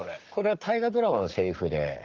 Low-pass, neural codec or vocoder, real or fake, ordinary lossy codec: 7.2 kHz; codec, 44.1 kHz, 7.8 kbps, Pupu-Codec; fake; Opus, 32 kbps